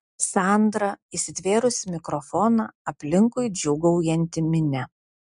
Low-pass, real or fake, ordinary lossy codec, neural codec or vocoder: 10.8 kHz; real; MP3, 64 kbps; none